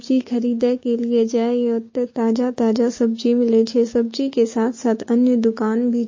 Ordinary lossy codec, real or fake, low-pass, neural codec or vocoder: MP3, 32 kbps; fake; 7.2 kHz; codec, 16 kHz, 6 kbps, DAC